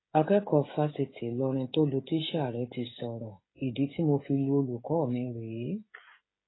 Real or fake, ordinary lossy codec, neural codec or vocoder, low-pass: fake; AAC, 16 kbps; codec, 16 kHz, 16 kbps, FreqCodec, smaller model; 7.2 kHz